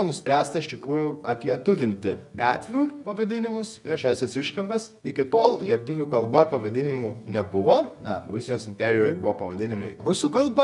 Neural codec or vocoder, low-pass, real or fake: codec, 24 kHz, 0.9 kbps, WavTokenizer, medium music audio release; 10.8 kHz; fake